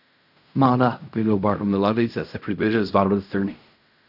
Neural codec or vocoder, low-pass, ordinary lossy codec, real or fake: codec, 16 kHz in and 24 kHz out, 0.4 kbps, LongCat-Audio-Codec, fine tuned four codebook decoder; 5.4 kHz; none; fake